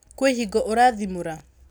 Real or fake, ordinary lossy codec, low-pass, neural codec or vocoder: real; none; none; none